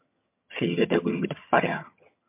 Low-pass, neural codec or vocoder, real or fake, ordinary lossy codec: 3.6 kHz; vocoder, 22.05 kHz, 80 mel bands, HiFi-GAN; fake; MP3, 32 kbps